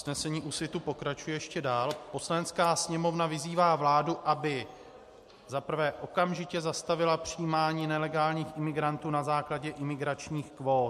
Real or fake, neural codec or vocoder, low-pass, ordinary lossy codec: real; none; 14.4 kHz; MP3, 64 kbps